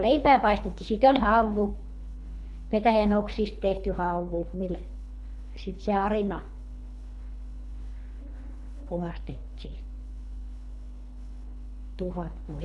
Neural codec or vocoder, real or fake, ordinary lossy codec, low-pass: codec, 24 kHz, 3 kbps, HILCodec; fake; none; none